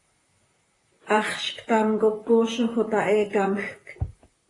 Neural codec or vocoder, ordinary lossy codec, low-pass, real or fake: vocoder, 44.1 kHz, 128 mel bands, Pupu-Vocoder; AAC, 32 kbps; 10.8 kHz; fake